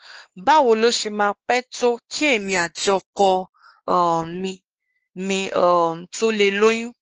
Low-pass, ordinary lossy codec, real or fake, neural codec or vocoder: 7.2 kHz; Opus, 16 kbps; fake; codec, 16 kHz, 2 kbps, X-Codec, WavLM features, trained on Multilingual LibriSpeech